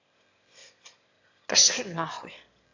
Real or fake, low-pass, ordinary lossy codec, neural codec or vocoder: fake; 7.2 kHz; AAC, 48 kbps; autoencoder, 22.05 kHz, a latent of 192 numbers a frame, VITS, trained on one speaker